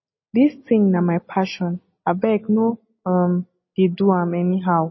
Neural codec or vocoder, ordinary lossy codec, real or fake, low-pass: none; MP3, 24 kbps; real; 7.2 kHz